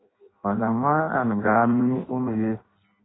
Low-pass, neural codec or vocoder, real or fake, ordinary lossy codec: 7.2 kHz; codec, 16 kHz in and 24 kHz out, 0.6 kbps, FireRedTTS-2 codec; fake; AAC, 16 kbps